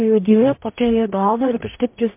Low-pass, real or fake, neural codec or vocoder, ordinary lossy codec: 3.6 kHz; fake; codec, 44.1 kHz, 0.9 kbps, DAC; MP3, 32 kbps